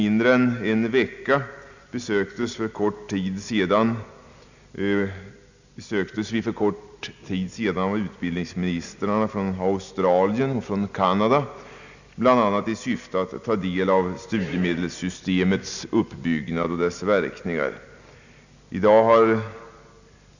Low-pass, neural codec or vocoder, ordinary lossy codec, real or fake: 7.2 kHz; none; none; real